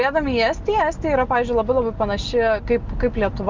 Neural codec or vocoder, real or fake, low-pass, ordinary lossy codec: none; real; 7.2 kHz; Opus, 32 kbps